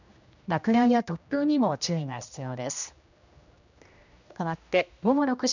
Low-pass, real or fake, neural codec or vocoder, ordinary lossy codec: 7.2 kHz; fake; codec, 16 kHz, 1 kbps, X-Codec, HuBERT features, trained on general audio; none